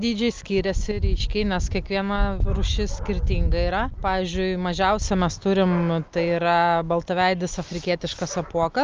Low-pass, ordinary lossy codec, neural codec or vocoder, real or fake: 7.2 kHz; Opus, 24 kbps; none; real